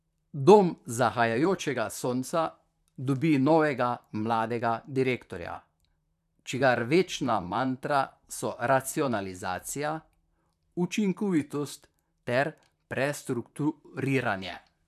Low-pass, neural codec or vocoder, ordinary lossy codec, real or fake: 14.4 kHz; vocoder, 44.1 kHz, 128 mel bands, Pupu-Vocoder; none; fake